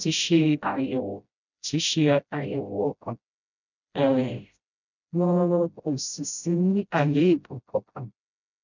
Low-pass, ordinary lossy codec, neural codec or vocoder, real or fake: 7.2 kHz; none; codec, 16 kHz, 0.5 kbps, FreqCodec, smaller model; fake